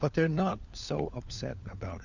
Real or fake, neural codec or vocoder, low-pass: fake; codec, 16 kHz, 4 kbps, FunCodec, trained on LibriTTS, 50 frames a second; 7.2 kHz